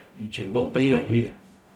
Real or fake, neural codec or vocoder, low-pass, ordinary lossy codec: fake; codec, 44.1 kHz, 0.9 kbps, DAC; 19.8 kHz; none